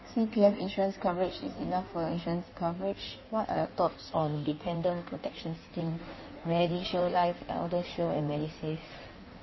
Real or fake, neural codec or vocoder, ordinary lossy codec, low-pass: fake; codec, 16 kHz in and 24 kHz out, 1.1 kbps, FireRedTTS-2 codec; MP3, 24 kbps; 7.2 kHz